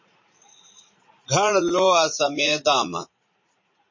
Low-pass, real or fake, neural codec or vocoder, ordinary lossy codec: 7.2 kHz; fake; vocoder, 44.1 kHz, 80 mel bands, Vocos; MP3, 32 kbps